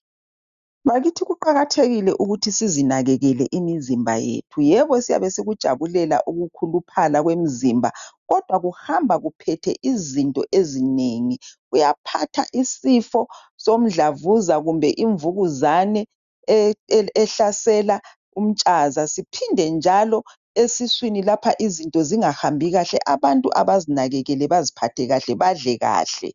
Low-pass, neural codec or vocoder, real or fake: 7.2 kHz; none; real